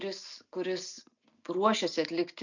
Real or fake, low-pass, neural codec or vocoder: real; 7.2 kHz; none